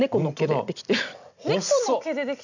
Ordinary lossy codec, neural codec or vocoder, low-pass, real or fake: none; none; 7.2 kHz; real